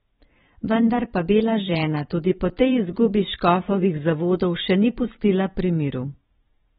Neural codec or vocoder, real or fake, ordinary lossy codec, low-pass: vocoder, 44.1 kHz, 128 mel bands every 512 samples, BigVGAN v2; fake; AAC, 16 kbps; 19.8 kHz